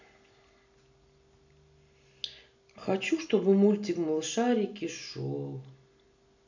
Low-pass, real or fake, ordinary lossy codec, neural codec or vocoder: 7.2 kHz; real; none; none